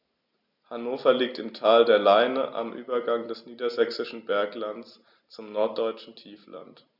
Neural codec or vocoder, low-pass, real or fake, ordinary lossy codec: none; 5.4 kHz; real; none